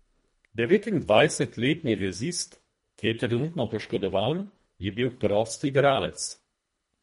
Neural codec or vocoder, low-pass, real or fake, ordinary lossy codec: codec, 24 kHz, 1.5 kbps, HILCodec; 10.8 kHz; fake; MP3, 48 kbps